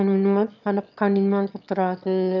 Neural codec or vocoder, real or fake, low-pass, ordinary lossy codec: autoencoder, 22.05 kHz, a latent of 192 numbers a frame, VITS, trained on one speaker; fake; 7.2 kHz; none